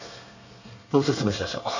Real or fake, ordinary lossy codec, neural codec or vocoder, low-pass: fake; none; codec, 24 kHz, 1 kbps, SNAC; 7.2 kHz